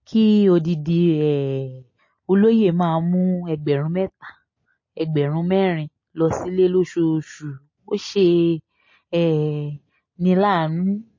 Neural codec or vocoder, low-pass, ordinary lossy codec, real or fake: none; 7.2 kHz; MP3, 32 kbps; real